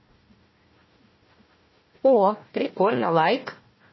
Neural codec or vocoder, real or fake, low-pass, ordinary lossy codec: codec, 16 kHz, 1 kbps, FunCodec, trained on Chinese and English, 50 frames a second; fake; 7.2 kHz; MP3, 24 kbps